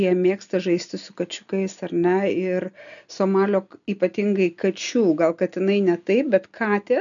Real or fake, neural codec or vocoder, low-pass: real; none; 7.2 kHz